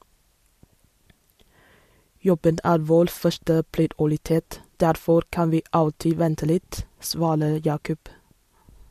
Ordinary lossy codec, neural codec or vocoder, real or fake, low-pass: MP3, 64 kbps; none; real; 14.4 kHz